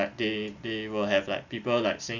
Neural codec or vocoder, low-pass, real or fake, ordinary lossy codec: none; 7.2 kHz; real; none